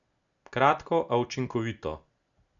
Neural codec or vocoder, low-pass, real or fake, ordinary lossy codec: none; 7.2 kHz; real; none